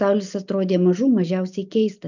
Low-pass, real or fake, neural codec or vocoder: 7.2 kHz; real; none